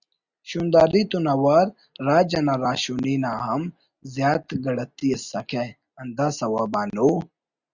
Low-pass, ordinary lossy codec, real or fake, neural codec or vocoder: 7.2 kHz; Opus, 64 kbps; real; none